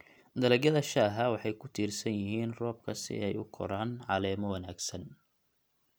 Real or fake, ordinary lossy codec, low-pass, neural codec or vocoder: real; none; none; none